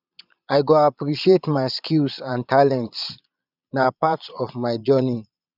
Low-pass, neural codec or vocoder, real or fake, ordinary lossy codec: 5.4 kHz; vocoder, 22.05 kHz, 80 mel bands, Vocos; fake; none